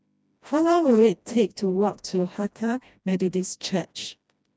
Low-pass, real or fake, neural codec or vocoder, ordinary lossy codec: none; fake; codec, 16 kHz, 1 kbps, FreqCodec, smaller model; none